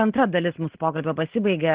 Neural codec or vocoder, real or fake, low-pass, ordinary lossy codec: none; real; 3.6 kHz; Opus, 16 kbps